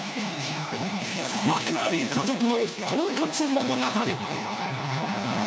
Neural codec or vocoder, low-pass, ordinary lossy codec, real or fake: codec, 16 kHz, 1 kbps, FunCodec, trained on LibriTTS, 50 frames a second; none; none; fake